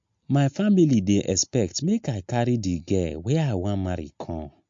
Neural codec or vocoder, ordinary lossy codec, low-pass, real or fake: none; MP3, 48 kbps; 7.2 kHz; real